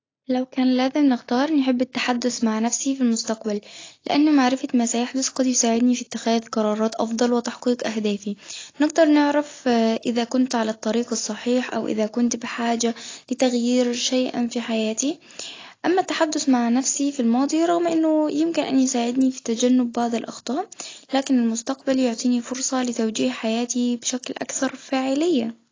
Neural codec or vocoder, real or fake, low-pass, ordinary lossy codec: none; real; 7.2 kHz; AAC, 32 kbps